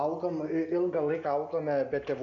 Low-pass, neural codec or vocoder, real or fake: 7.2 kHz; none; real